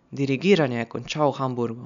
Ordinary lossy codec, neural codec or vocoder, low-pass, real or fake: none; none; 7.2 kHz; real